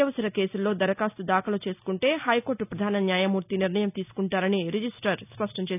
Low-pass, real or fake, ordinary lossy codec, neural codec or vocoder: 3.6 kHz; real; none; none